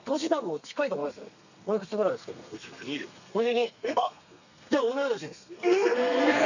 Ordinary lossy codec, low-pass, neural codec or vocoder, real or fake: none; 7.2 kHz; codec, 32 kHz, 1.9 kbps, SNAC; fake